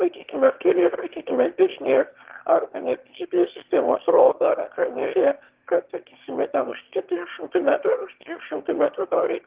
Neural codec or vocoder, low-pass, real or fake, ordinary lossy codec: autoencoder, 22.05 kHz, a latent of 192 numbers a frame, VITS, trained on one speaker; 3.6 kHz; fake; Opus, 16 kbps